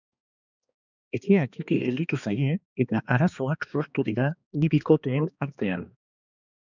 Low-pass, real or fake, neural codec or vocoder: 7.2 kHz; fake; codec, 16 kHz, 2 kbps, X-Codec, HuBERT features, trained on balanced general audio